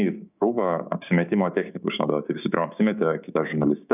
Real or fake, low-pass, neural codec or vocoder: fake; 3.6 kHz; codec, 16 kHz, 6 kbps, DAC